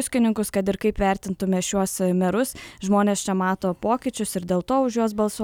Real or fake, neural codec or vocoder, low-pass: real; none; 19.8 kHz